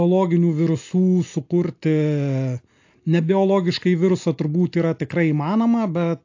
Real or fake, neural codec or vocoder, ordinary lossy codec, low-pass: real; none; AAC, 48 kbps; 7.2 kHz